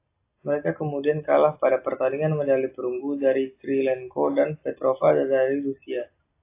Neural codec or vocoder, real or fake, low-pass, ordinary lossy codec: none; real; 3.6 kHz; AAC, 24 kbps